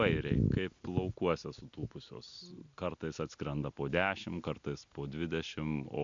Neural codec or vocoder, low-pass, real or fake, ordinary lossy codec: none; 7.2 kHz; real; MP3, 64 kbps